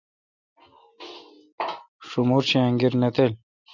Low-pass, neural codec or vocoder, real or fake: 7.2 kHz; none; real